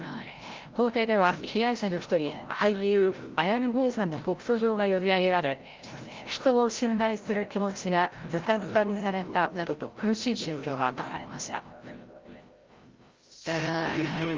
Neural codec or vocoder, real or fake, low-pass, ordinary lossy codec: codec, 16 kHz, 0.5 kbps, FreqCodec, larger model; fake; 7.2 kHz; Opus, 24 kbps